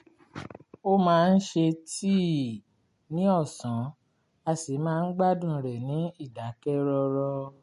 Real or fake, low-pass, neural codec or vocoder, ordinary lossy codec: real; 14.4 kHz; none; MP3, 48 kbps